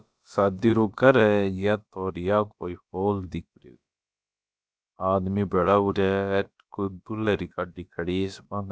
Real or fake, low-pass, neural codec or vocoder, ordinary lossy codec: fake; none; codec, 16 kHz, about 1 kbps, DyCAST, with the encoder's durations; none